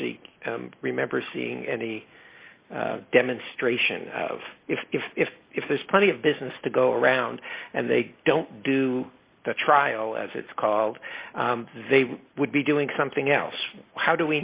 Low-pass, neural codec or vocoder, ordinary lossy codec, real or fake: 3.6 kHz; none; Opus, 64 kbps; real